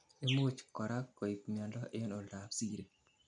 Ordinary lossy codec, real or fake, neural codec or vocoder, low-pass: none; real; none; 9.9 kHz